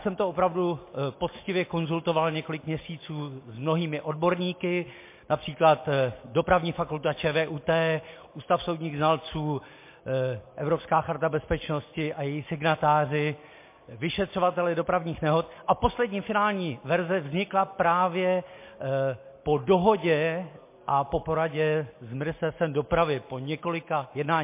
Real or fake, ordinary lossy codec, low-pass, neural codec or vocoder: real; MP3, 24 kbps; 3.6 kHz; none